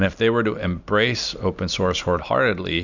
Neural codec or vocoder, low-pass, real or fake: none; 7.2 kHz; real